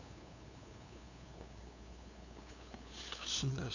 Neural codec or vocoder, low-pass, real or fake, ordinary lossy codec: codec, 16 kHz, 2 kbps, FunCodec, trained on LibriTTS, 25 frames a second; 7.2 kHz; fake; none